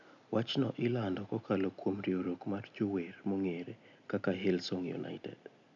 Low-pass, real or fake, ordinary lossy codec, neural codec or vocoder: 7.2 kHz; real; none; none